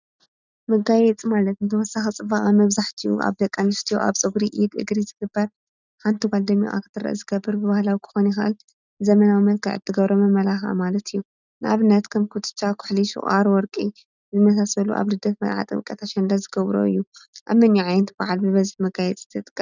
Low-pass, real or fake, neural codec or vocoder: 7.2 kHz; real; none